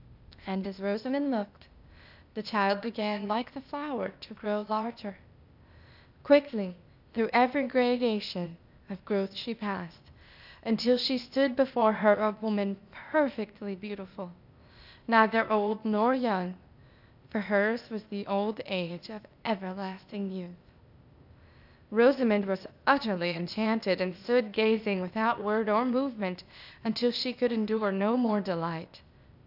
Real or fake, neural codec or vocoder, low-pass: fake; codec, 16 kHz, 0.8 kbps, ZipCodec; 5.4 kHz